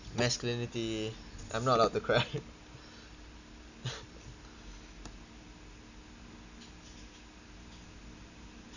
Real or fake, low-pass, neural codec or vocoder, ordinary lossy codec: real; 7.2 kHz; none; none